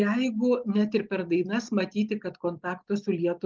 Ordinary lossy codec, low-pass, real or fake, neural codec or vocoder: Opus, 32 kbps; 7.2 kHz; real; none